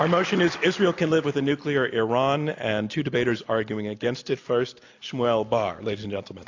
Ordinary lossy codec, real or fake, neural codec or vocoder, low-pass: AAC, 48 kbps; real; none; 7.2 kHz